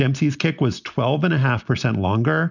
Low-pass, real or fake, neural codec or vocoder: 7.2 kHz; real; none